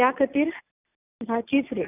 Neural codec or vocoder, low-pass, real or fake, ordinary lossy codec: none; 3.6 kHz; real; none